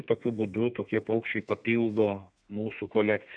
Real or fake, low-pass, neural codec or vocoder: fake; 9.9 kHz; codec, 32 kHz, 1.9 kbps, SNAC